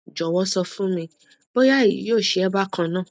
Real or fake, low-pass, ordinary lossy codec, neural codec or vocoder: real; none; none; none